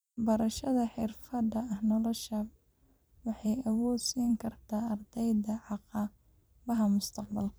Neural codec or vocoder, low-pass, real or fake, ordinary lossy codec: vocoder, 44.1 kHz, 128 mel bands every 256 samples, BigVGAN v2; none; fake; none